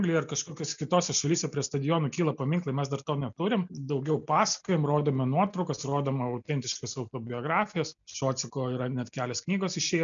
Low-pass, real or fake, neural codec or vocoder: 7.2 kHz; real; none